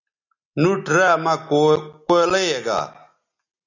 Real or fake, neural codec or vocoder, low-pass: real; none; 7.2 kHz